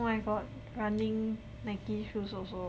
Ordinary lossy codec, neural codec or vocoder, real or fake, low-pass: none; none; real; none